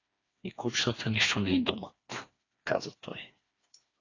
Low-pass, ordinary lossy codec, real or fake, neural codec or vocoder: 7.2 kHz; AAC, 32 kbps; fake; codec, 16 kHz, 2 kbps, FreqCodec, smaller model